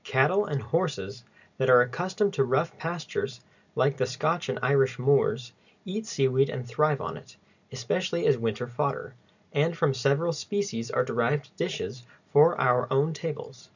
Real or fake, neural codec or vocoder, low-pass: real; none; 7.2 kHz